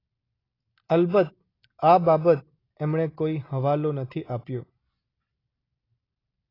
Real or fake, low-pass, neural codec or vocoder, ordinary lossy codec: real; 5.4 kHz; none; AAC, 24 kbps